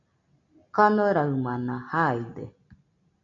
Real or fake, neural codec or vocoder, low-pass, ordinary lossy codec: real; none; 7.2 kHz; AAC, 64 kbps